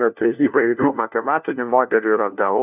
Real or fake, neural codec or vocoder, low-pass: fake; codec, 16 kHz, 1 kbps, FunCodec, trained on LibriTTS, 50 frames a second; 3.6 kHz